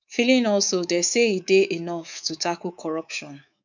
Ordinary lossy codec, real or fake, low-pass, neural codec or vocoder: none; fake; 7.2 kHz; codec, 24 kHz, 3.1 kbps, DualCodec